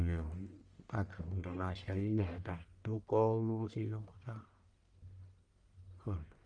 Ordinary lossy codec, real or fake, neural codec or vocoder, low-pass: Opus, 32 kbps; fake; codec, 44.1 kHz, 1.7 kbps, Pupu-Codec; 9.9 kHz